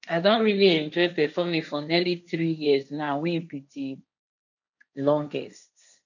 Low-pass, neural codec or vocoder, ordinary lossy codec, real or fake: 7.2 kHz; codec, 16 kHz, 1.1 kbps, Voila-Tokenizer; none; fake